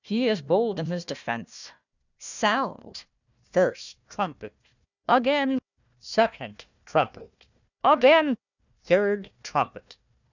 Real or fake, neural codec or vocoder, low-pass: fake; codec, 16 kHz, 1 kbps, FunCodec, trained on Chinese and English, 50 frames a second; 7.2 kHz